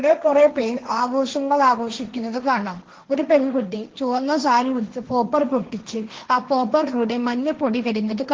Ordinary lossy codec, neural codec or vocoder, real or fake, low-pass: Opus, 16 kbps; codec, 16 kHz, 1.1 kbps, Voila-Tokenizer; fake; 7.2 kHz